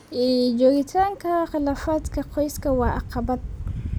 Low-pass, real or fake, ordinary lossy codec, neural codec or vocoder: none; real; none; none